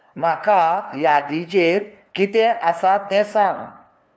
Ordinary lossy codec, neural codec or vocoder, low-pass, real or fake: none; codec, 16 kHz, 2 kbps, FunCodec, trained on LibriTTS, 25 frames a second; none; fake